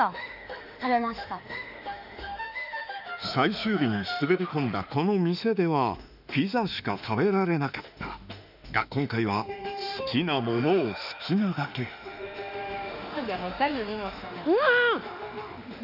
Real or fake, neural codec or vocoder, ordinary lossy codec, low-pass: fake; autoencoder, 48 kHz, 32 numbers a frame, DAC-VAE, trained on Japanese speech; none; 5.4 kHz